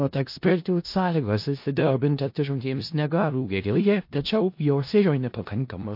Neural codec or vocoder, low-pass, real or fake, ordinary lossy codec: codec, 16 kHz in and 24 kHz out, 0.4 kbps, LongCat-Audio-Codec, four codebook decoder; 5.4 kHz; fake; MP3, 32 kbps